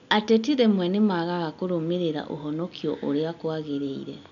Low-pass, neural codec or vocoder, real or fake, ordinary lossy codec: 7.2 kHz; none; real; none